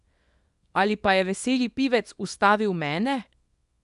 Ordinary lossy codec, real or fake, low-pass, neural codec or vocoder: none; fake; 10.8 kHz; codec, 24 kHz, 0.9 kbps, WavTokenizer, small release